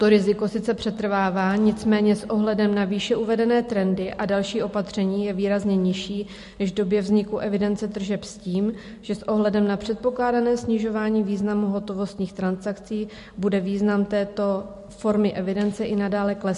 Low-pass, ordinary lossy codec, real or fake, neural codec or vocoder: 14.4 kHz; MP3, 48 kbps; real; none